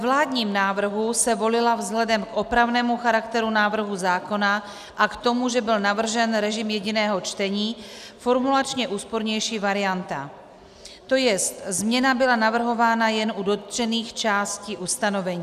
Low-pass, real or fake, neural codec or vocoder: 14.4 kHz; real; none